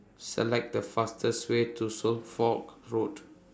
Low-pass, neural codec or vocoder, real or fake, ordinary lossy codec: none; none; real; none